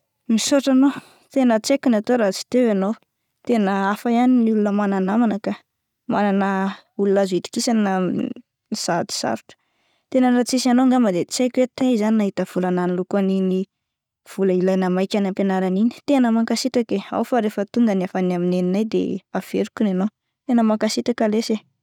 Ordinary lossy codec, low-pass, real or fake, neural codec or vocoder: none; 19.8 kHz; real; none